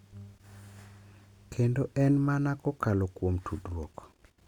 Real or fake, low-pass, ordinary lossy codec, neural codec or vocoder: real; 19.8 kHz; none; none